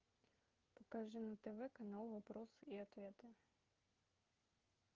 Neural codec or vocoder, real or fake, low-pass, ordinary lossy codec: codec, 16 kHz, 16 kbps, FreqCodec, smaller model; fake; 7.2 kHz; Opus, 32 kbps